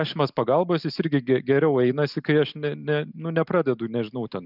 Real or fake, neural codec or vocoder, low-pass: real; none; 5.4 kHz